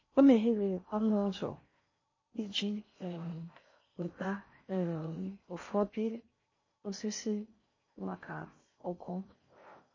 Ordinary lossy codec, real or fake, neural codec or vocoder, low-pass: MP3, 32 kbps; fake; codec, 16 kHz in and 24 kHz out, 0.6 kbps, FocalCodec, streaming, 4096 codes; 7.2 kHz